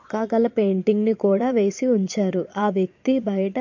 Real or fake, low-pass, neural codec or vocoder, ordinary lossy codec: fake; 7.2 kHz; vocoder, 22.05 kHz, 80 mel bands, Vocos; MP3, 48 kbps